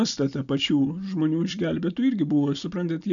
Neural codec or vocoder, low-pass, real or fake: none; 7.2 kHz; real